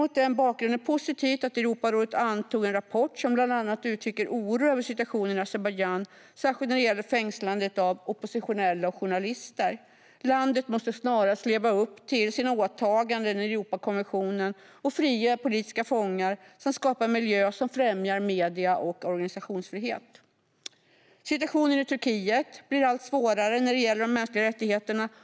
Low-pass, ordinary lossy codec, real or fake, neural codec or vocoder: none; none; real; none